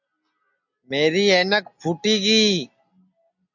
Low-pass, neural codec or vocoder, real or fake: 7.2 kHz; none; real